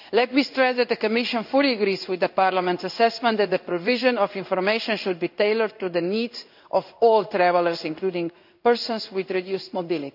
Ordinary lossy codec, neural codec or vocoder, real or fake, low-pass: none; codec, 16 kHz in and 24 kHz out, 1 kbps, XY-Tokenizer; fake; 5.4 kHz